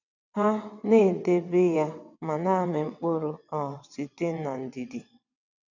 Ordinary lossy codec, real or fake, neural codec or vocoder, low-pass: none; fake; vocoder, 22.05 kHz, 80 mel bands, WaveNeXt; 7.2 kHz